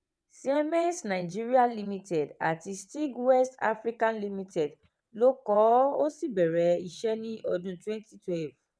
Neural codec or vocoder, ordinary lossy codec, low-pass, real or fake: vocoder, 22.05 kHz, 80 mel bands, WaveNeXt; none; none; fake